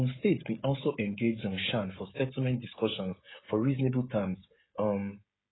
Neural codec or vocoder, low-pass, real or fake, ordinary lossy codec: codec, 16 kHz, 16 kbps, FreqCodec, smaller model; 7.2 kHz; fake; AAC, 16 kbps